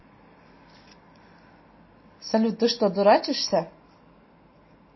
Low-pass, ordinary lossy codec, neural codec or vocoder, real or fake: 7.2 kHz; MP3, 24 kbps; none; real